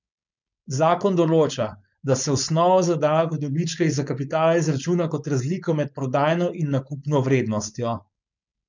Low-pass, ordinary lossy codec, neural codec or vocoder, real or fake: 7.2 kHz; none; codec, 16 kHz, 4.8 kbps, FACodec; fake